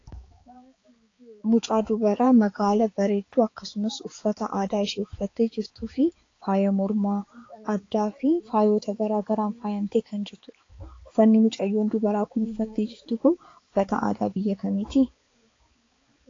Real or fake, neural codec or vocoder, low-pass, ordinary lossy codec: fake; codec, 16 kHz, 4 kbps, X-Codec, HuBERT features, trained on balanced general audio; 7.2 kHz; AAC, 32 kbps